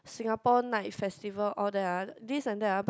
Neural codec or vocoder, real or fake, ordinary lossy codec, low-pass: none; real; none; none